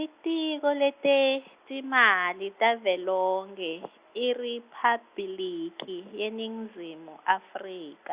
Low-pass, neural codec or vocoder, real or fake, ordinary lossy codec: 3.6 kHz; none; real; Opus, 64 kbps